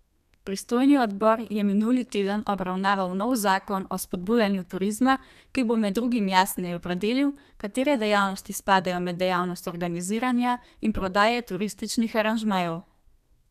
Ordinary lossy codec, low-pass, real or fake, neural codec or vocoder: none; 14.4 kHz; fake; codec, 32 kHz, 1.9 kbps, SNAC